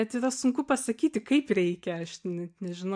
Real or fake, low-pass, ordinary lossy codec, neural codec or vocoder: real; 9.9 kHz; MP3, 64 kbps; none